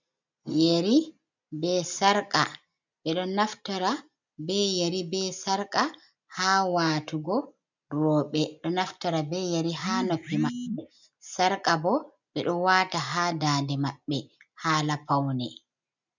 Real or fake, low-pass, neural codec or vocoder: real; 7.2 kHz; none